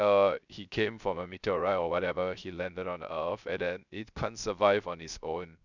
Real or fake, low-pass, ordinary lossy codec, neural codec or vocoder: fake; 7.2 kHz; none; codec, 16 kHz, 0.3 kbps, FocalCodec